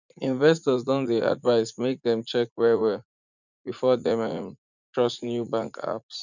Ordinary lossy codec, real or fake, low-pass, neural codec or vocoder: none; fake; 7.2 kHz; vocoder, 44.1 kHz, 80 mel bands, Vocos